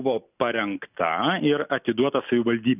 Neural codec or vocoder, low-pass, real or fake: none; 3.6 kHz; real